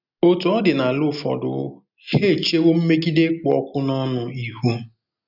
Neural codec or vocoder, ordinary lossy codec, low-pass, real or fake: none; none; 5.4 kHz; real